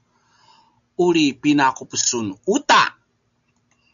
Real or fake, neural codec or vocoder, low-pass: real; none; 7.2 kHz